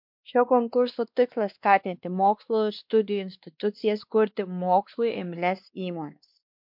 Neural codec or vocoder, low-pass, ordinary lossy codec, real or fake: codec, 16 kHz, 2 kbps, X-Codec, WavLM features, trained on Multilingual LibriSpeech; 5.4 kHz; MP3, 48 kbps; fake